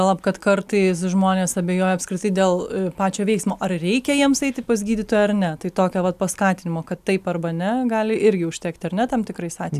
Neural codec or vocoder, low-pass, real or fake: none; 14.4 kHz; real